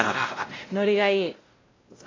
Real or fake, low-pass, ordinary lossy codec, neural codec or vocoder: fake; 7.2 kHz; AAC, 32 kbps; codec, 16 kHz, 0.5 kbps, X-Codec, WavLM features, trained on Multilingual LibriSpeech